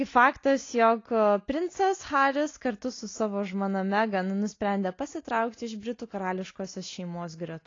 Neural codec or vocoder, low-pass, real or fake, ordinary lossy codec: none; 7.2 kHz; real; AAC, 32 kbps